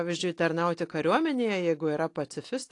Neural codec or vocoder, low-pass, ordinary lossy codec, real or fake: none; 10.8 kHz; AAC, 48 kbps; real